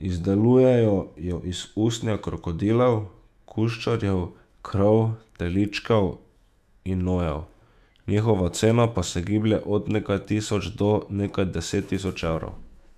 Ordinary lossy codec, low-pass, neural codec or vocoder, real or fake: none; 14.4 kHz; autoencoder, 48 kHz, 128 numbers a frame, DAC-VAE, trained on Japanese speech; fake